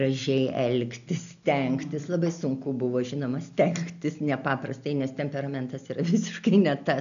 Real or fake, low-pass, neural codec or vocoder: real; 7.2 kHz; none